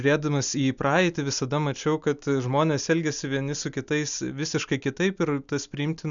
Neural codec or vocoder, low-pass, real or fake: none; 7.2 kHz; real